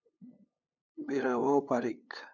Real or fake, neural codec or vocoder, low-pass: fake; codec, 16 kHz, 8 kbps, FunCodec, trained on LibriTTS, 25 frames a second; 7.2 kHz